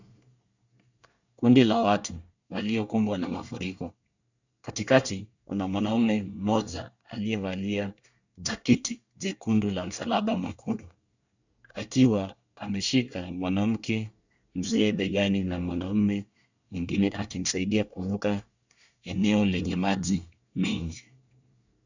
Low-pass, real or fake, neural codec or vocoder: 7.2 kHz; fake; codec, 24 kHz, 1 kbps, SNAC